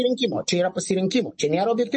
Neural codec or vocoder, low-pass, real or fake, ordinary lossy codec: none; 10.8 kHz; real; MP3, 32 kbps